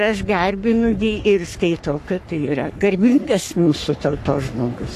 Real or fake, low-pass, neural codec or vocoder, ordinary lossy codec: fake; 14.4 kHz; codec, 44.1 kHz, 3.4 kbps, Pupu-Codec; AAC, 64 kbps